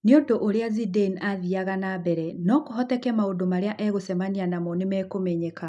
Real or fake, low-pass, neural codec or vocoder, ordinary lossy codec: real; 10.8 kHz; none; none